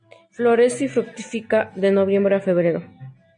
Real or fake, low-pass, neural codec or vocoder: real; 9.9 kHz; none